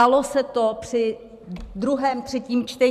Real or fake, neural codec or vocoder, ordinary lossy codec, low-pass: fake; vocoder, 44.1 kHz, 128 mel bands every 512 samples, BigVGAN v2; MP3, 96 kbps; 14.4 kHz